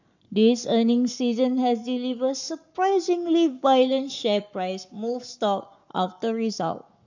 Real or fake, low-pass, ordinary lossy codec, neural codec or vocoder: fake; 7.2 kHz; none; codec, 44.1 kHz, 7.8 kbps, Pupu-Codec